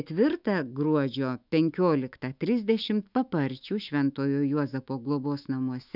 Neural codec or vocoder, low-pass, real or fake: autoencoder, 48 kHz, 128 numbers a frame, DAC-VAE, trained on Japanese speech; 5.4 kHz; fake